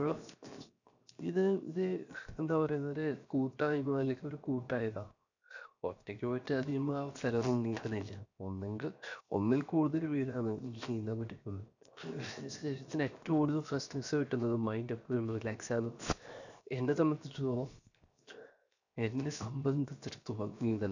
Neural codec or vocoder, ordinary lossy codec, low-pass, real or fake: codec, 16 kHz, 0.7 kbps, FocalCodec; none; 7.2 kHz; fake